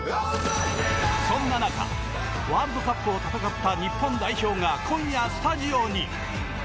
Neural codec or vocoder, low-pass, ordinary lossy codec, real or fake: none; none; none; real